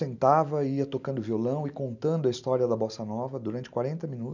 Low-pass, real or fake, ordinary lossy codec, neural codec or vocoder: 7.2 kHz; real; none; none